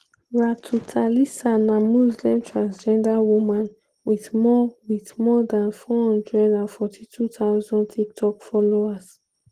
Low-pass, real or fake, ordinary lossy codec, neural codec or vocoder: 14.4 kHz; real; Opus, 16 kbps; none